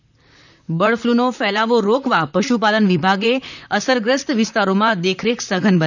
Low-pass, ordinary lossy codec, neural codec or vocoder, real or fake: 7.2 kHz; none; vocoder, 44.1 kHz, 128 mel bands, Pupu-Vocoder; fake